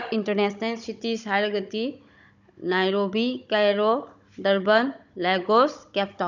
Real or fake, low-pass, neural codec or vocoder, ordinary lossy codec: fake; 7.2 kHz; codec, 16 kHz, 16 kbps, FunCodec, trained on Chinese and English, 50 frames a second; none